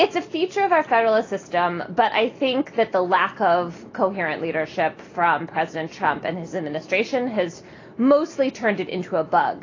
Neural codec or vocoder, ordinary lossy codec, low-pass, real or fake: none; AAC, 32 kbps; 7.2 kHz; real